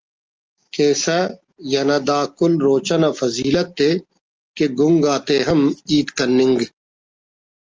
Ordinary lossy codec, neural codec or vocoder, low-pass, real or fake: Opus, 24 kbps; none; 7.2 kHz; real